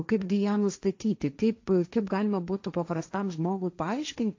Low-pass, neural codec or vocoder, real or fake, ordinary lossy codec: 7.2 kHz; codec, 16 kHz, 1.1 kbps, Voila-Tokenizer; fake; AAC, 48 kbps